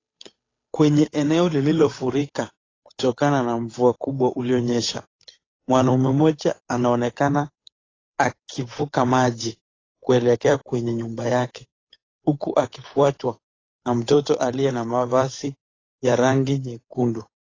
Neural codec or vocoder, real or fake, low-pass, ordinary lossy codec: codec, 16 kHz, 8 kbps, FunCodec, trained on Chinese and English, 25 frames a second; fake; 7.2 kHz; AAC, 32 kbps